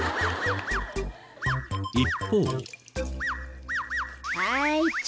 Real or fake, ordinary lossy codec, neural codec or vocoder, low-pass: real; none; none; none